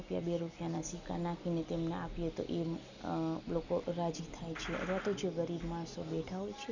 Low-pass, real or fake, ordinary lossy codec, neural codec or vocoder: 7.2 kHz; real; none; none